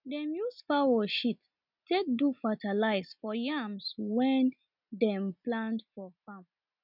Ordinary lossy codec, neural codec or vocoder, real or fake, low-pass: none; none; real; 5.4 kHz